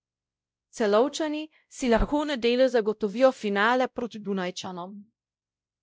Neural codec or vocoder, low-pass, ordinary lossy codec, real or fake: codec, 16 kHz, 0.5 kbps, X-Codec, WavLM features, trained on Multilingual LibriSpeech; none; none; fake